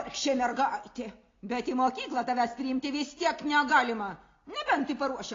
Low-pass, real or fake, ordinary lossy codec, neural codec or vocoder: 7.2 kHz; real; AAC, 32 kbps; none